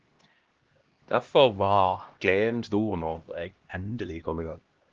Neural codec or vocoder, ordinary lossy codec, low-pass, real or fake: codec, 16 kHz, 1 kbps, X-Codec, HuBERT features, trained on LibriSpeech; Opus, 16 kbps; 7.2 kHz; fake